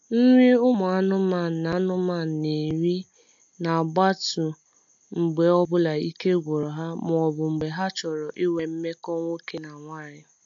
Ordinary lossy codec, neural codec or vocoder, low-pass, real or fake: AAC, 64 kbps; none; 7.2 kHz; real